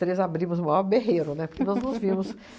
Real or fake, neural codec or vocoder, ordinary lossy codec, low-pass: real; none; none; none